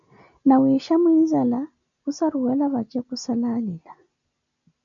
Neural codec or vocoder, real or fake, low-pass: none; real; 7.2 kHz